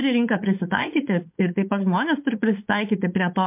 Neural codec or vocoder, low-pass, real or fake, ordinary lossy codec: codec, 16 kHz, 4.8 kbps, FACodec; 3.6 kHz; fake; MP3, 32 kbps